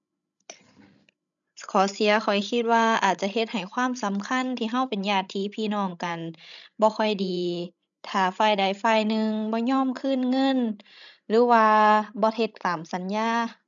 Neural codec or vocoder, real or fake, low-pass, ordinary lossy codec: codec, 16 kHz, 16 kbps, FreqCodec, larger model; fake; 7.2 kHz; none